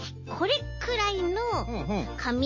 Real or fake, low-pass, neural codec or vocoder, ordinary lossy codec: real; 7.2 kHz; none; MP3, 32 kbps